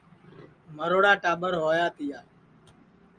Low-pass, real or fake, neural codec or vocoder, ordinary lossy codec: 9.9 kHz; real; none; Opus, 32 kbps